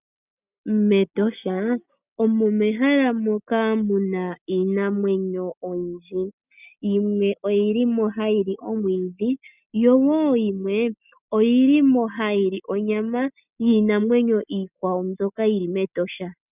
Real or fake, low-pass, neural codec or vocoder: real; 3.6 kHz; none